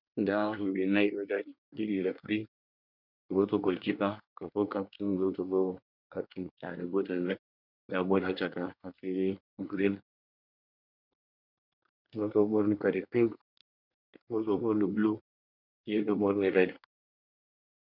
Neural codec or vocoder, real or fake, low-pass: codec, 24 kHz, 1 kbps, SNAC; fake; 5.4 kHz